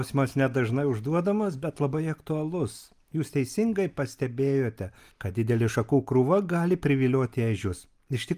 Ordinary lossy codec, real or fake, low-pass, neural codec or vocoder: Opus, 32 kbps; real; 14.4 kHz; none